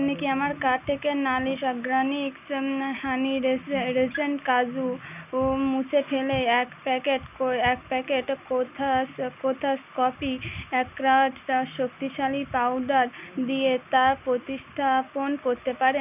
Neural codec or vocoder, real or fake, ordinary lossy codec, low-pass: none; real; none; 3.6 kHz